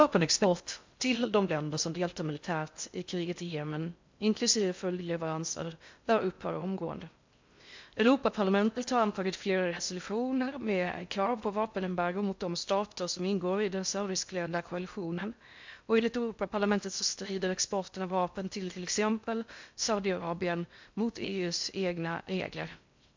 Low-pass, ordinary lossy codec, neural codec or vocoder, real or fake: 7.2 kHz; MP3, 64 kbps; codec, 16 kHz in and 24 kHz out, 0.6 kbps, FocalCodec, streaming, 4096 codes; fake